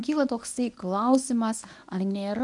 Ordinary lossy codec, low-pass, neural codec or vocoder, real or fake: MP3, 96 kbps; 10.8 kHz; codec, 24 kHz, 0.9 kbps, WavTokenizer, medium speech release version 1; fake